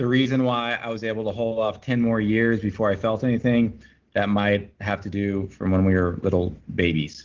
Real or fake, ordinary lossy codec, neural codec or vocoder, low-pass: real; Opus, 16 kbps; none; 7.2 kHz